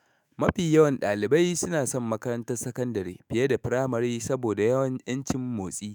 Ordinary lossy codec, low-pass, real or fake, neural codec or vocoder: none; none; fake; autoencoder, 48 kHz, 128 numbers a frame, DAC-VAE, trained on Japanese speech